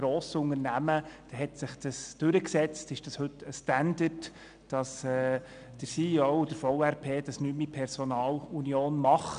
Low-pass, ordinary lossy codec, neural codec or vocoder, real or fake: 9.9 kHz; none; none; real